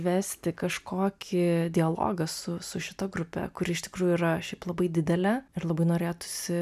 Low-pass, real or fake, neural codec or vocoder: 14.4 kHz; real; none